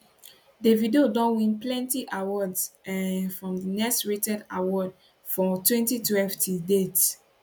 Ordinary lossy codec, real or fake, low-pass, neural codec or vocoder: none; real; 19.8 kHz; none